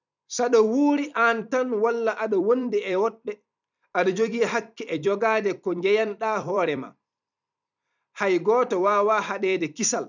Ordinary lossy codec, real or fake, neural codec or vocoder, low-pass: none; fake; vocoder, 44.1 kHz, 128 mel bands every 256 samples, BigVGAN v2; 7.2 kHz